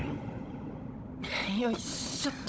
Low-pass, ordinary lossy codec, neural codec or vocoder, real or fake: none; none; codec, 16 kHz, 16 kbps, FunCodec, trained on Chinese and English, 50 frames a second; fake